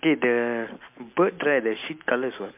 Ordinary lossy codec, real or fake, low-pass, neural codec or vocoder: MP3, 32 kbps; real; 3.6 kHz; none